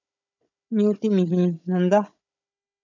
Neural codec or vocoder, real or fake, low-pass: codec, 16 kHz, 16 kbps, FunCodec, trained on Chinese and English, 50 frames a second; fake; 7.2 kHz